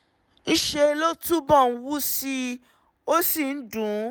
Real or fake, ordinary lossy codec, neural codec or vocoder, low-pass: real; none; none; none